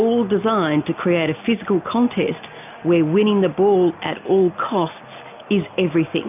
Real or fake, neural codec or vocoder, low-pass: real; none; 3.6 kHz